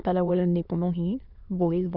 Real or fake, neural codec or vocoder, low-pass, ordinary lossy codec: fake; autoencoder, 22.05 kHz, a latent of 192 numbers a frame, VITS, trained on many speakers; 5.4 kHz; none